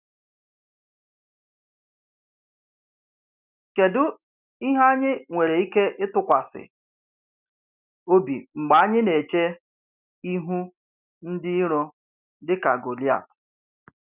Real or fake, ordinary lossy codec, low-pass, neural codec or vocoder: real; none; 3.6 kHz; none